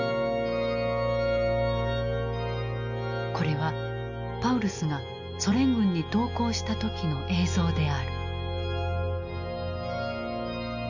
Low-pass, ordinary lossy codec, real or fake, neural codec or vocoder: 7.2 kHz; none; real; none